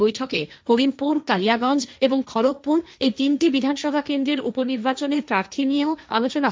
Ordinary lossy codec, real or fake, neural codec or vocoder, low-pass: none; fake; codec, 16 kHz, 1.1 kbps, Voila-Tokenizer; none